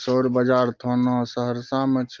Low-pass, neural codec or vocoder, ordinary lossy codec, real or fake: 7.2 kHz; none; Opus, 32 kbps; real